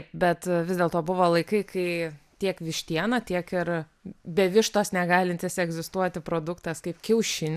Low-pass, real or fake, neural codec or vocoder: 14.4 kHz; real; none